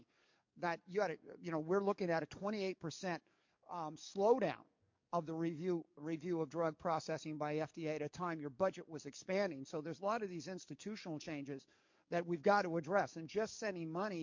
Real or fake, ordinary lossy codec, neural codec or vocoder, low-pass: fake; MP3, 48 kbps; codec, 44.1 kHz, 7.8 kbps, DAC; 7.2 kHz